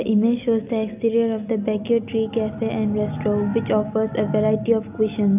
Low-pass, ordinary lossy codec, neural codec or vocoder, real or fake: 3.6 kHz; none; none; real